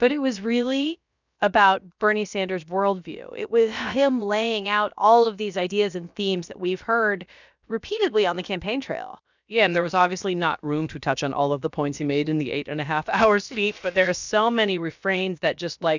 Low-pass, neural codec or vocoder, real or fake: 7.2 kHz; codec, 16 kHz, about 1 kbps, DyCAST, with the encoder's durations; fake